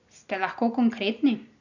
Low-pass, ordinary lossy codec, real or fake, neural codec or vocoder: 7.2 kHz; none; real; none